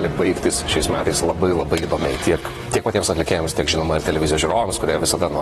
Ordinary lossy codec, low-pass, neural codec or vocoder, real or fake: AAC, 32 kbps; 19.8 kHz; vocoder, 44.1 kHz, 128 mel bands, Pupu-Vocoder; fake